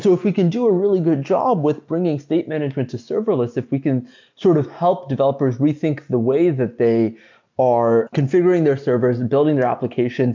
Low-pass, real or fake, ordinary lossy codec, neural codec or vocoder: 7.2 kHz; real; MP3, 64 kbps; none